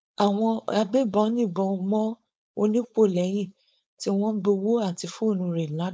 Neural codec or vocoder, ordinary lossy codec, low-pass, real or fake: codec, 16 kHz, 4.8 kbps, FACodec; none; none; fake